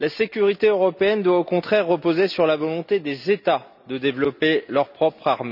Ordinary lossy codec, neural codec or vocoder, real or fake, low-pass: none; none; real; 5.4 kHz